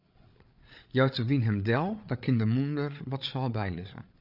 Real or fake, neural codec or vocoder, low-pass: fake; codec, 16 kHz, 8 kbps, FreqCodec, larger model; 5.4 kHz